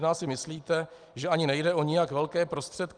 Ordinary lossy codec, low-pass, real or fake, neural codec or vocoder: Opus, 32 kbps; 9.9 kHz; real; none